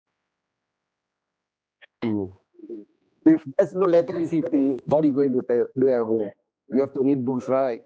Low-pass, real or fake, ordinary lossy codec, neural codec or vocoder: none; fake; none; codec, 16 kHz, 2 kbps, X-Codec, HuBERT features, trained on general audio